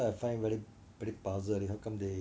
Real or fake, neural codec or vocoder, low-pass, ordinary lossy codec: real; none; none; none